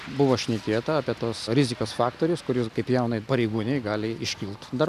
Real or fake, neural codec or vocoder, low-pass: real; none; 14.4 kHz